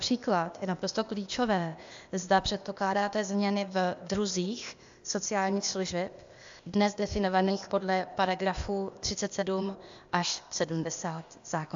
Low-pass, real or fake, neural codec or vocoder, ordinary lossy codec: 7.2 kHz; fake; codec, 16 kHz, 0.8 kbps, ZipCodec; AAC, 64 kbps